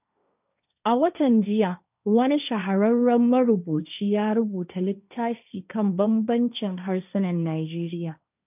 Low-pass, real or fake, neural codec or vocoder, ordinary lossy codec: 3.6 kHz; fake; codec, 16 kHz, 1.1 kbps, Voila-Tokenizer; none